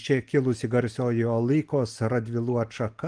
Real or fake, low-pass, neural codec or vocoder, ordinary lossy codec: real; 9.9 kHz; none; Opus, 24 kbps